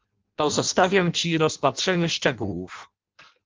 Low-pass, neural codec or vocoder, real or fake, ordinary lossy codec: 7.2 kHz; codec, 16 kHz in and 24 kHz out, 0.6 kbps, FireRedTTS-2 codec; fake; Opus, 32 kbps